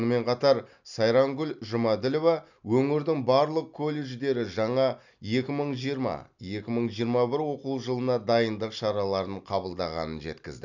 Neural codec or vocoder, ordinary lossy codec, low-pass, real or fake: none; none; 7.2 kHz; real